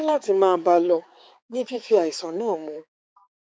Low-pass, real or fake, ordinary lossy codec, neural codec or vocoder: none; fake; none; codec, 16 kHz, 4 kbps, X-Codec, HuBERT features, trained on balanced general audio